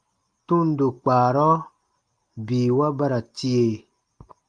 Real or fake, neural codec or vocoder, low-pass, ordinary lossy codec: real; none; 9.9 kHz; Opus, 32 kbps